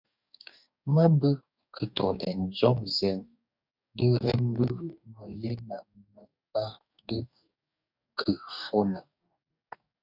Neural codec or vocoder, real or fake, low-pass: codec, 44.1 kHz, 2.6 kbps, DAC; fake; 5.4 kHz